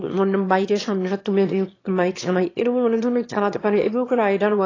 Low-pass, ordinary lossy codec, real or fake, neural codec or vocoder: 7.2 kHz; AAC, 32 kbps; fake; autoencoder, 22.05 kHz, a latent of 192 numbers a frame, VITS, trained on one speaker